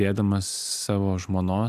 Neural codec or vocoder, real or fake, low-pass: none; real; 14.4 kHz